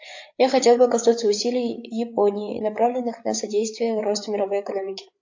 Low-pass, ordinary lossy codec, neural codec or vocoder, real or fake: 7.2 kHz; AAC, 48 kbps; codec, 16 kHz, 8 kbps, FreqCodec, larger model; fake